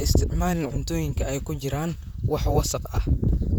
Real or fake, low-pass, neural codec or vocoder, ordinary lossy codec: fake; none; vocoder, 44.1 kHz, 128 mel bands, Pupu-Vocoder; none